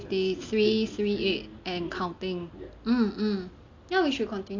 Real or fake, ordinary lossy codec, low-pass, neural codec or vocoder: real; AAC, 48 kbps; 7.2 kHz; none